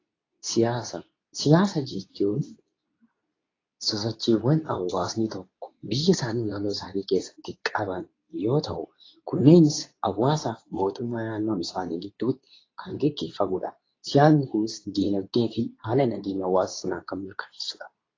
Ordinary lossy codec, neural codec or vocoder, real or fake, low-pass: AAC, 32 kbps; codec, 24 kHz, 0.9 kbps, WavTokenizer, medium speech release version 2; fake; 7.2 kHz